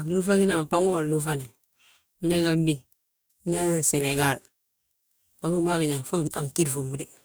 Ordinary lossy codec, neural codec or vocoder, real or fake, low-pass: none; codec, 44.1 kHz, 2.6 kbps, DAC; fake; none